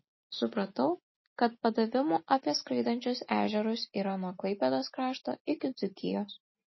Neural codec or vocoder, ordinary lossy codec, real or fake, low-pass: none; MP3, 24 kbps; real; 7.2 kHz